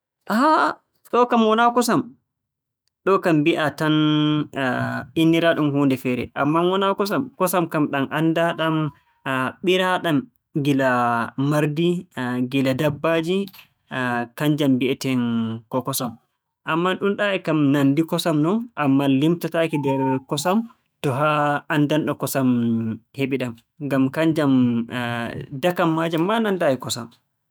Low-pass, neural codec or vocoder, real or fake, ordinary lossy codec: none; autoencoder, 48 kHz, 128 numbers a frame, DAC-VAE, trained on Japanese speech; fake; none